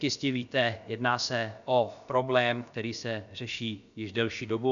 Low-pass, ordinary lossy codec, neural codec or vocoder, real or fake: 7.2 kHz; MP3, 96 kbps; codec, 16 kHz, about 1 kbps, DyCAST, with the encoder's durations; fake